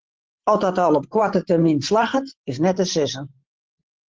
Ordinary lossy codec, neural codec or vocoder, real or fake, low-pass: Opus, 16 kbps; vocoder, 44.1 kHz, 128 mel bands every 512 samples, BigVGAN v2; fake; 7.2 kHz